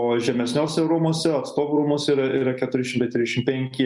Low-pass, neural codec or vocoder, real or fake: 10.8 kHz; none; real